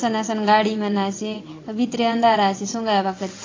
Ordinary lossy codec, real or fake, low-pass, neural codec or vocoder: AAC, 32 kbps; fake; 7.2 kHz; vocoder, 22.05 kHz, 80 mel bands, WaveNeXt